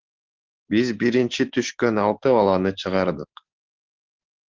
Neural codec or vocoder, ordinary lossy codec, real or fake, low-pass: none; Opus, 16 kbps; real; 7.2 kHz